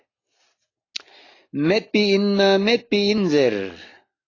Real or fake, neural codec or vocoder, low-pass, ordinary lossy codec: real; none; 7.2 kHz; AAC, 32 kbps